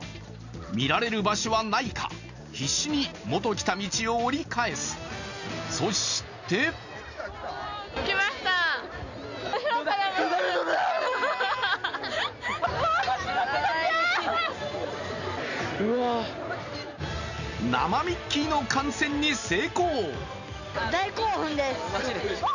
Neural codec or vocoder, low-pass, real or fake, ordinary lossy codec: none; 7.2 kHz; real; none